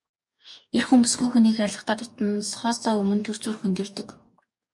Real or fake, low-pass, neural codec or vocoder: fake; 10.8 kHz; codec, 44.1 kHz, 2.6 kbps, DAC